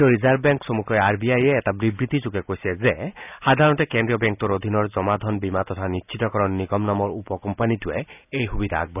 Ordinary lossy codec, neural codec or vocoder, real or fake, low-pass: none; none; real; 3.6 kHz